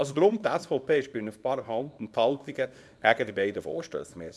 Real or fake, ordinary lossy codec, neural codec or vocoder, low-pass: fake; none; codec, 24 kHz, 0.9 kbps, WavTokenizer, small release; none